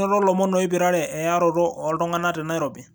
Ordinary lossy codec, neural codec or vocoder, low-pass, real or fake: none; none; none; real